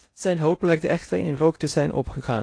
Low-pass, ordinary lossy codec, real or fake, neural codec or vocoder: 9.9 kHz; AAC, 48 kbps; fake; codec, 16 kHz in and 24 kHz out, 0.6 kbps, FocalCodec, streaming, 2048 codes